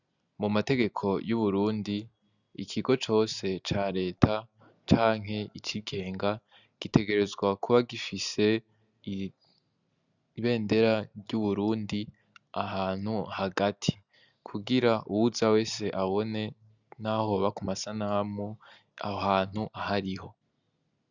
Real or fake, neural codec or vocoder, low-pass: real; none; 7.2 kHz